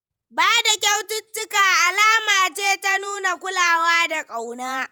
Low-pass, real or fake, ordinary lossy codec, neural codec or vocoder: none; fake; none; vocoder, 48 kHz, 128 mel bands, Vocos